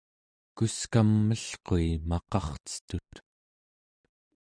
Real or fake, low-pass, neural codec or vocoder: real; 9.9 kHz; none